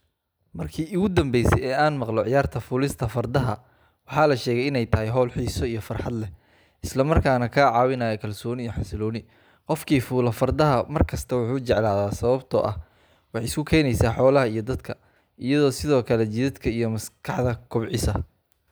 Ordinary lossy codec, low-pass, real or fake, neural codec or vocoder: none; none; real; none